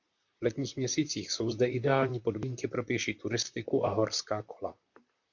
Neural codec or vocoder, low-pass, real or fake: vocoder, 44.1 kHz, 128 mel bands, Pupu-Vocoder; 7.2 kHz; fake